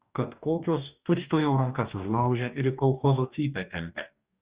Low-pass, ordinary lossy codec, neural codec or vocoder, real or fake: 3.6 kHz; Opus, 64 kbps; codec, 44.1 kHz, 2.6 kbps, DAC; fake